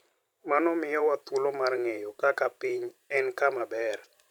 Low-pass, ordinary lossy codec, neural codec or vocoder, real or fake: 19.8 kHz; none; vocoder, 44.1 kHz, 128 mel bands every 512 samples, BigVGAN v2; fake